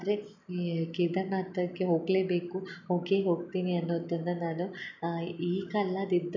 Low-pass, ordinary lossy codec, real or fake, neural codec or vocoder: 7.2 kHz; none; real; none